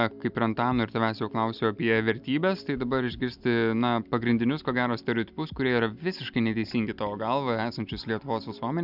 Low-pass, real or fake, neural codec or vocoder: 5.4 kHz; real; none